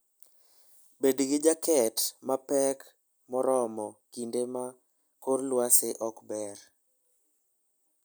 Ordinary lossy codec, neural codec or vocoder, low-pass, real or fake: none; none; none; real